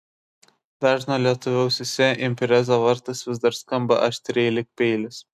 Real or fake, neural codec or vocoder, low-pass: real; none; 14.4 kHz